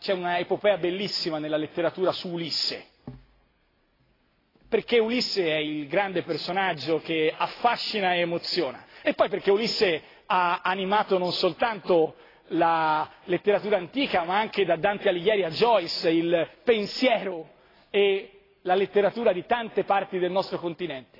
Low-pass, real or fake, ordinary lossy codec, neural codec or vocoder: 5.4 kHz; real; AAC, 24 kbps; none